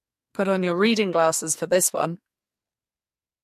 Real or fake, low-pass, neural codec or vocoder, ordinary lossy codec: fake; 14.4 kHz; codec, 44.1 kHz, 2.6 kbps, SNAC; MP3, 64 kbps